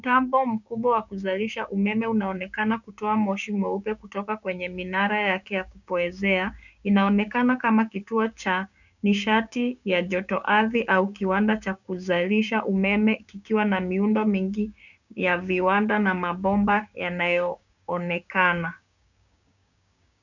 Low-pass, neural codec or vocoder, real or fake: 7.2 kHz; codec, 16 kHz, 6 kbps, DAC; fake